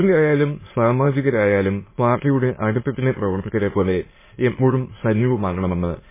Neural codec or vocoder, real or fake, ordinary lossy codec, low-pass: autoencoder, 22.05 kHz, a latent of 192 numbers a frame, VITS, trained on many speakers; fake; MP3, 16 kbps; 3.6 kHz